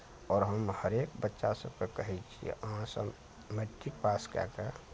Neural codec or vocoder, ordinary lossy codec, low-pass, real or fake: none; none; none; real